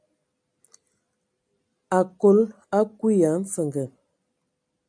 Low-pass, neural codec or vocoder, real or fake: 9.9 kHz; none; real